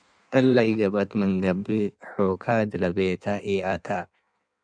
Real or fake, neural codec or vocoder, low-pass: fake; codec, 16 kHz in and 24 kHz out, 1.1 kbps, FireRedTTS-2 codec; 9.9 kHz